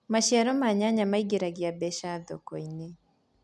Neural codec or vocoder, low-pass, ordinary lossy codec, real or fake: none; none; none; real